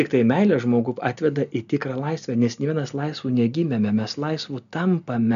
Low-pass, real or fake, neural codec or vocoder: 7.2 kHz; real; none